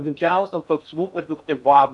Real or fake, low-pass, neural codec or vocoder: fake; 10.8 kHz; codec, 16 kHz in and 24 kHz out, 0.6 kbps, FocalCodec, streaming, 4096 codes